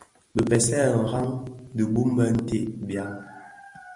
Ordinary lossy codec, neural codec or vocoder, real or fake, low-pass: MP3, 96 kbps; none; real; 10.8 kHz